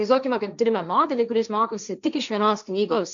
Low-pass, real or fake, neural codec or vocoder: 7.2 kHz; fake; codec, 16 kHz, 1.1 kbps, Voila-Tokenizer